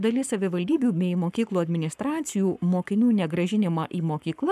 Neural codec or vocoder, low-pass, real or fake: codec, 44.1 kHz, 7.8 kbps, Pupu-Codec; 14.4 kHz; fake